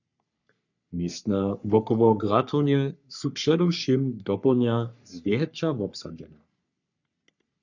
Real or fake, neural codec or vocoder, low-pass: fake; codec, 44.1 kHz, 3.4 kbps, Pupu-Codec; 7.2 kHz